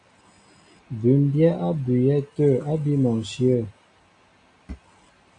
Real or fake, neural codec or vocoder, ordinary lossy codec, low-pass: real; none; AAC, 64 kbps; 9.9 kHz